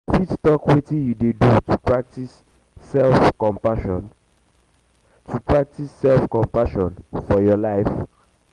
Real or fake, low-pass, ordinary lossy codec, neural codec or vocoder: real; 10.8 kHz; Opus, 24 kbps; none